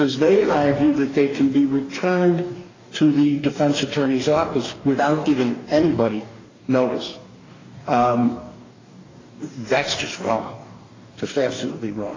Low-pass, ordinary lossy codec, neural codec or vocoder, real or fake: 7.2 kHz; AAC, 32 kbps; codec, 44.1 kHz, 2.6 kbps, DAC; fake